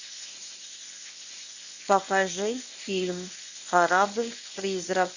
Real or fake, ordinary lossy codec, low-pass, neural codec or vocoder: fake; none; 7.2 kHz; codec, 24 kHz, 0.9 kbps, WavTokenizer, medium speech release version 1